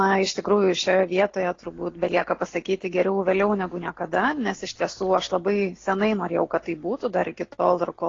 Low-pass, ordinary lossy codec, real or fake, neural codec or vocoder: 7.2 kHz; AAC, 32 kbps; real; none